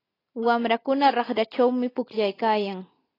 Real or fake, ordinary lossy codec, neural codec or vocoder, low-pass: real; AAC, 24 kbps; none; 5.4 kHz